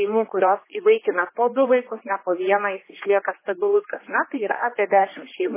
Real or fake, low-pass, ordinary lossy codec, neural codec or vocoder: fake; 3.6 kHz; MP3, 16 kbps; codec, 16 kHz, 2 kbps, X-Codec, HuBERT features, trained on general audio